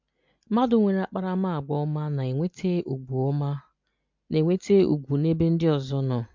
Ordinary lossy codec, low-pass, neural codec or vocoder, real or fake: MP3, 64 kbps; 7.2 kHz; none; real